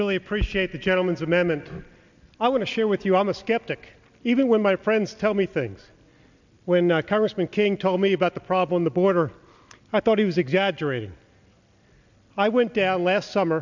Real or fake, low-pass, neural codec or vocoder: real; 7.2 kHz; none